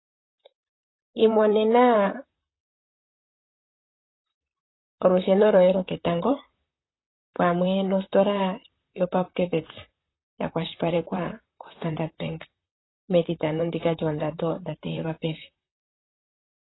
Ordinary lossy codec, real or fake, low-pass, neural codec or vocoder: AAC, 16 kbps; fake; 7.2 kHz; vocoder, 44.1 kHz, 128 mel bands, Pupu-Vocoder